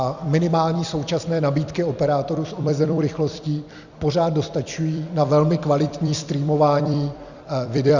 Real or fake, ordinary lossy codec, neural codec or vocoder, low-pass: fake; Opus, 64 kbps; vocoder, 44.1 kHz, 128 mel bands every 256 samples, BigVGAN v2; 7.2 kHz